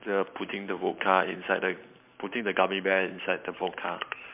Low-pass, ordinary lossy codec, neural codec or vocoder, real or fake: 3.6 kHz; MP3, 32 kbps; vocoder, 44.1 kHz, 128 mel bands every 512 samples, BigVGAN v2; fake